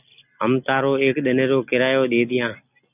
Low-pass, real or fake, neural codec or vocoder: 3.6 kHz; real; none